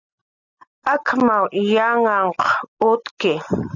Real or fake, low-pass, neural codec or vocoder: real; 7.2 kHz; none